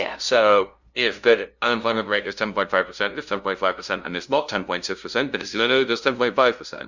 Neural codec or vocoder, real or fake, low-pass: codec, 16 kHz, 0.5 kbps, FunCodec, trained on LibriTTS, 25 frames a second; fake; 7.2 kHz